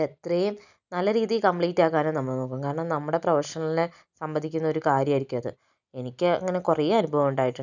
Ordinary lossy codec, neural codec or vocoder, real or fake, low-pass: none; none; real; 7.2 kHz